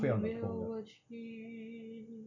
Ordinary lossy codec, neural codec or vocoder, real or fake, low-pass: none; none; real; 7.2 kHz